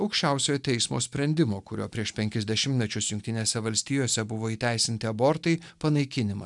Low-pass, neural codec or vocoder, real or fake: 10.8 kHz; none; real